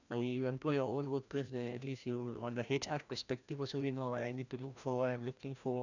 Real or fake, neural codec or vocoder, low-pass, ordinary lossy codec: fake; codec, 16 kHz, 1 kbps, FreqCodec, larger model; 7.2 kHz; none